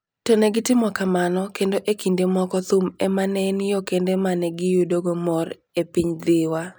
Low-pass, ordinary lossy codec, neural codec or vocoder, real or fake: none; none; none; real